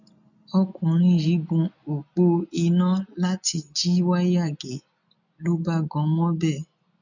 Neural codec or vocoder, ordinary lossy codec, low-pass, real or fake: none; none; 7.2 kHz; real